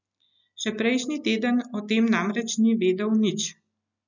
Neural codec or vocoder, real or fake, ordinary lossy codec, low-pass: none; real; none; 7.2 kHz